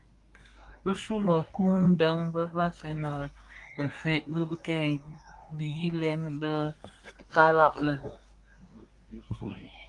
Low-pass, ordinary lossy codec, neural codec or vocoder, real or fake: 10.8 kHz; Opus, 32 kbps; codec, 24 kHz, 1 kbps, SNAC; fake